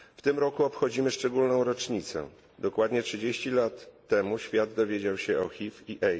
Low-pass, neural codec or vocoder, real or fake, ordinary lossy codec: none; none; real; none